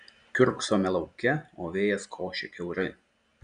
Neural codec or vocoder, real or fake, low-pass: none; real; 9.9 kHz